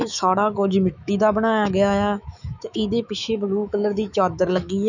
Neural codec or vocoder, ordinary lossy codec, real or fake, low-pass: autoencoder, 48 kHz, 128 numbers a frame, DAC-VAE, trained on Japanese speech; none; fake; 7.2 kHz